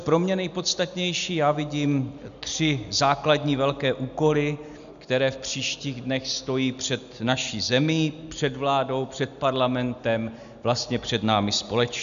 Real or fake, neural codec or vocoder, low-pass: real; none; 7.2 kHz